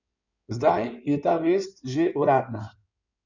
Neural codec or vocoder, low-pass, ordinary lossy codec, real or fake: codec, 16 kHz in and 24 kHz out, 2.2 kbps, FireRedTTS-2 codec; 7.2 kHz; none; fake